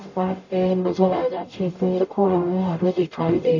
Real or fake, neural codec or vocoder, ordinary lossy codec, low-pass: fake; codec, 44.1 kHz, 0.9 kbps, DAC; none; 7.2 kHz